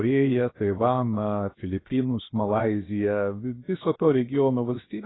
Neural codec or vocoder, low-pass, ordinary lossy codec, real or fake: codec, 16 kHz, 0.7 kbps, FocalCodec; 7.2 kHz; AAC, 16 kbps; fake